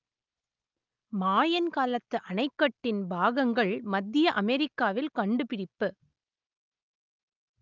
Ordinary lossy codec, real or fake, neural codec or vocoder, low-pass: Opus, 32 kbps; real; none; 7.2 kHz